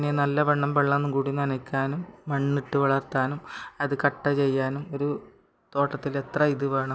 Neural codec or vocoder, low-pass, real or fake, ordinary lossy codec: none; none; real; none